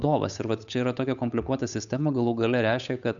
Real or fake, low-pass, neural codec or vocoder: fake; 7.2 kHz; codec, 16 kHz, 8 kbps, FunCodec, trained on Chinese and English, 25 frames a second